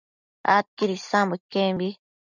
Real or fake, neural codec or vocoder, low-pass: real; none; 7.2 kHz